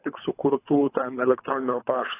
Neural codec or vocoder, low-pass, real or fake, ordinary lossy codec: codec, 24 kHz, 3 kbps, HILCodec; 3.6 kHz; fake; AAC, 16 kbps